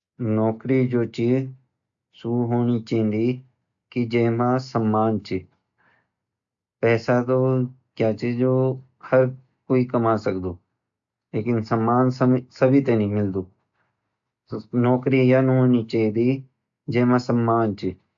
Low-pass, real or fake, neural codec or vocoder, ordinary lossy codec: 7.2 kHz; real; none; AAC, 64 kbps